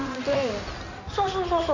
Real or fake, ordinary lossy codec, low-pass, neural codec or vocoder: fake; none; 7.2 kHz; codec, 16 kHz in and 24 kHz out, 2.2 kbps, FireRedTTS-2 codec